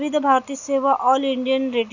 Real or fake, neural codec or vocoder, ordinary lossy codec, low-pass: real; none; none; 7.2 kHz